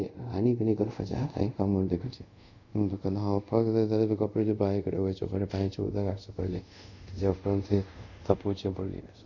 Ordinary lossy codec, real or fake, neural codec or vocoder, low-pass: none; fake; codec, 24 kHz, 0.5 kbps, DualCodec; 7.2 kHz